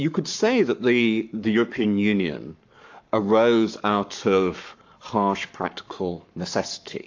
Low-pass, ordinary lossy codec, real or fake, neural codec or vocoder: 7.2 kHz; AAC, 48 kbps; fake; codec, 44.1 kHz, 7.8 kbps, Pupu-Codec